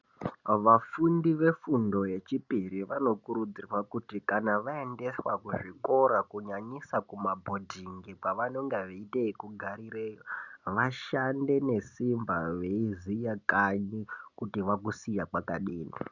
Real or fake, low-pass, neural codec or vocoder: real; 7.2 kHz; none